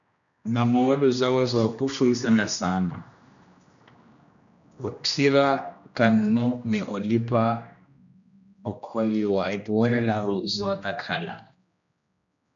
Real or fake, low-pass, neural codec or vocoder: fake; 7.2 kHz; codec, 16 kHz, 1 kbps, X-Codec, HuBERT features, trained on general audio